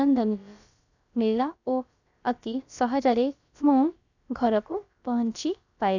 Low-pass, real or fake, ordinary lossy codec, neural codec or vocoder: 7.2 kHz; fake; none; codec, 16 kHz, about 1 kbps, DyCAST, with the encoder's durations